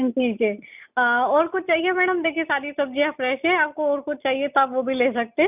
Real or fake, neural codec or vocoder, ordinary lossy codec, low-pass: real; none; none; 3.6 kHz